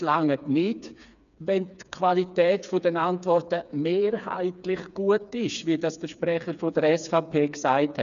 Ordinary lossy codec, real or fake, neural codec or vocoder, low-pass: none; fake; codec, 16 kHz, 4 kbps, FreqCodec, smaller model; 7.2 kHz